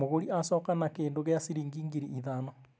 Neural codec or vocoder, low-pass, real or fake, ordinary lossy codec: none; none; real; none